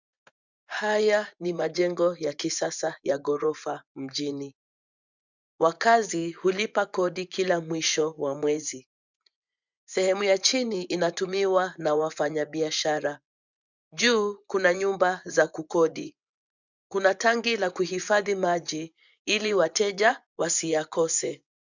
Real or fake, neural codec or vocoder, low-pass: fake; vocoder, 24 kHz, 100 mel bands, Vocos; 7.2 kHz